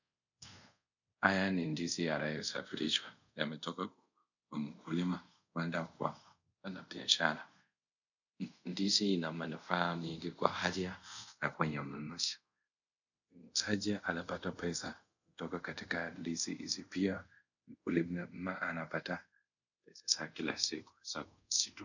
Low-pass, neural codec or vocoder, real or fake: 7.2 kHz; codec, 24 kHz, 0.5 kbps, DualCodec; fake